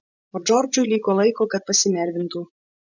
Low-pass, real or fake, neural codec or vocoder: 7.2 kHz; fake; vocoder, 24 kHz, 100 mel bands, Vocos